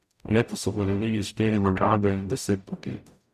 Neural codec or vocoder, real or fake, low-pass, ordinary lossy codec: codec, 44.1 kHz, 0.9 kbps, DAC; fake; 14.4 kHz; none